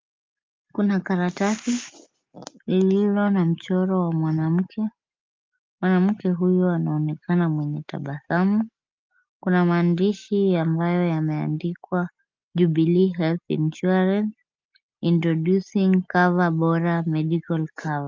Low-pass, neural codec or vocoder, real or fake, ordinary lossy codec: 7.2 kHz; none; real; Opus, 24 kbps